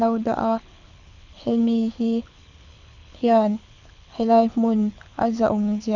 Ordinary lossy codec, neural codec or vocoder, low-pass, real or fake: none; codec, 24 kHz, 6 kbps, HILCodec; 7.2 kHz; fake